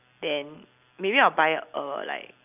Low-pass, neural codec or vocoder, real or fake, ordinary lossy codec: 3.6 kHz; none; real; none